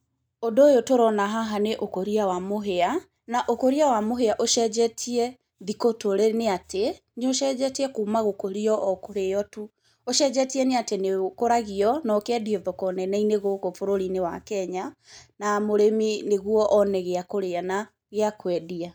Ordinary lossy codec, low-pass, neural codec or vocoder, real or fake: none; none; none; real